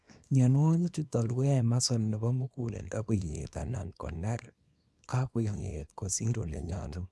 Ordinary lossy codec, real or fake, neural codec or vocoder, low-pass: none; fake; codec, 24 kHz, 0.9 kbps, WavTokenizer, small release; none